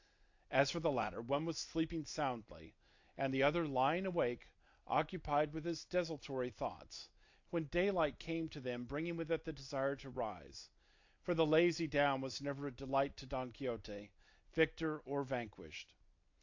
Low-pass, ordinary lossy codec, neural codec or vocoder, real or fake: 7.2 kHz; AAC, 48 kbps; none; real